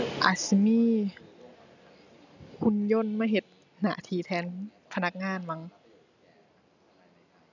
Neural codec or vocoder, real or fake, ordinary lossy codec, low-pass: none; real; none; 7.2 kHz